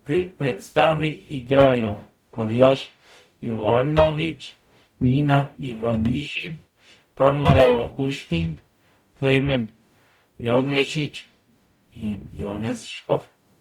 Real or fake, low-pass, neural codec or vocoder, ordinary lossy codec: fake; 19.8 kHz; codec, 44.1 kHz, 0.9 kbps, DAC; Opus, 64 kbps